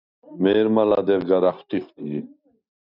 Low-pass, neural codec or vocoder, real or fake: 5.4 kHz; none; real